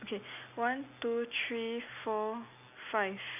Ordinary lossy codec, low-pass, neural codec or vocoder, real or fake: none; 3.6 kHz; none; real